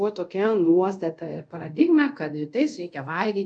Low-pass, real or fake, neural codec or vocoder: 9.9 kHz; fake; codec, 24 kHz, 0.5 kbps, DualCodec